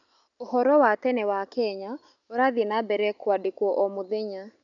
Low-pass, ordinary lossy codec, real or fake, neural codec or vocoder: 7.2 kHz; none; real; none